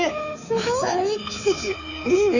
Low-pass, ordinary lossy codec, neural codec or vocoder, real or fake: 7.2 kHz; none; codec, 24 kHz, 3.1 kbps, DualCodec; fake